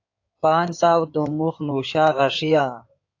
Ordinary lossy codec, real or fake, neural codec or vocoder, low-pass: AAC, 48 kbps; fake; codec, 16 kHz in and 24 kHz out, 2.2 kbps, FireRedTTS-2 codec; 7.2 kHz